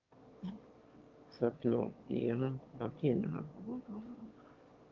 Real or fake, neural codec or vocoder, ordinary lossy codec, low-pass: fake; autoencoder, 22.05 kHz, a latent of 192 numbers a frame, VITS, trained on one speaker; Opus, 32 kbps; 7.2 kHz